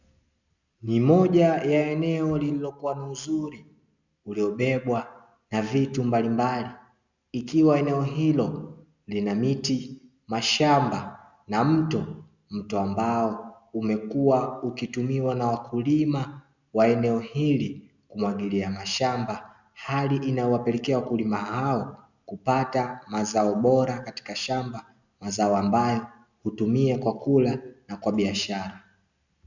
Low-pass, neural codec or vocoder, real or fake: 7.2 kHz; none; real